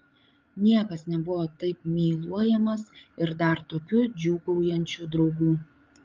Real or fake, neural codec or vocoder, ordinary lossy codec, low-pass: fake; codec, 16 kHz, 8 kbps, FreqCodec, larger model; Opus, 32 kbps; 7.2 kHz